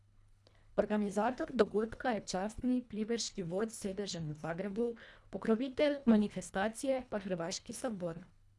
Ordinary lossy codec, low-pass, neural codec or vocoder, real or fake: none; none; codec, 24 kHz, 1.5 kbps, HILCodec; fake